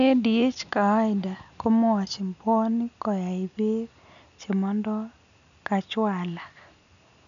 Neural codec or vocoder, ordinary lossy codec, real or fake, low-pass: none; none; real; 7.2 kHz